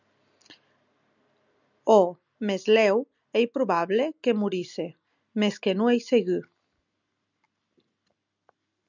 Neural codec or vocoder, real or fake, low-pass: none; real; 7.2 kHz